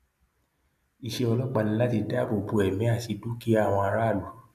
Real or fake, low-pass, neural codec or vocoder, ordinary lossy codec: real; 14.4 kHz; none; none